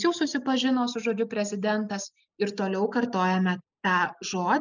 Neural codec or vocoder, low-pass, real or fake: none; 7.2 kHz; real